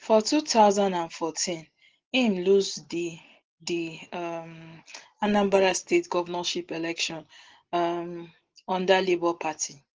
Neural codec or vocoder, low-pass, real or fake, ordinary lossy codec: none; 7.2 kHz; real; Opus, 16 kbps